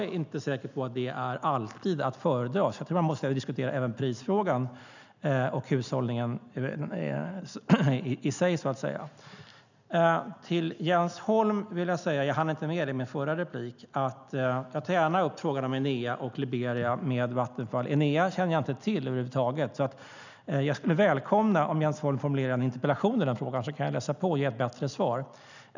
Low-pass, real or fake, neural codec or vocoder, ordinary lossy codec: 7.2 kHz; real; none; none